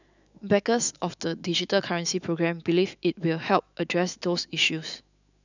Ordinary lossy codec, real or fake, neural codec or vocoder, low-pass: none; fake; autoencoder, 48 kHz, 128 numbers a frame, DAC-VAE, trained on Japanese speech; 7.2 kHz